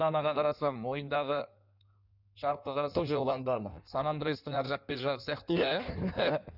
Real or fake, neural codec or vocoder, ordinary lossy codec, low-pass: fake; codec, 16 kHz in and 24 kHz out, 1.1 kbps, FireRedTTS-2 codec; none; 5.4 kHz